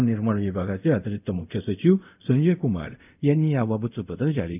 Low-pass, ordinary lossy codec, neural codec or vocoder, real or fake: 3.6 kHz; none; codec, 24 kHz, 0.5 kbps, DualCodec; fake